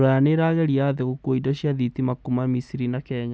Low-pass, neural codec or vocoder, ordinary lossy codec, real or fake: none; none; none; real